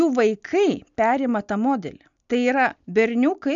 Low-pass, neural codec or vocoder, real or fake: 7.2 kHz; none; real